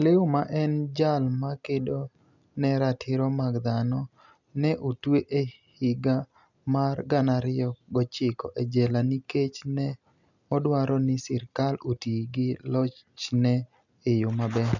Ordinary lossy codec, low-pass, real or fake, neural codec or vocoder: none; 7.2 kHz; real; none